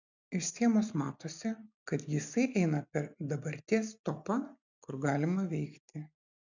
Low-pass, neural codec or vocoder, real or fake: 7.2 kHz; none; real